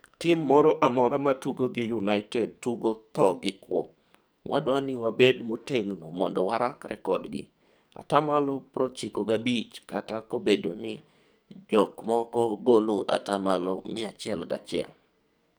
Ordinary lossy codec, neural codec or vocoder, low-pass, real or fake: none; codec, 44.1 kHz, 2.6 kbps, SNAC; none; fake